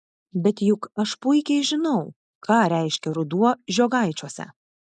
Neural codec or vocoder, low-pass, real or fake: none; 10.8 kHz; real